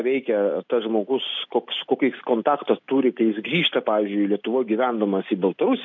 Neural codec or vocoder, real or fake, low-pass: none; real; 7.2 kHz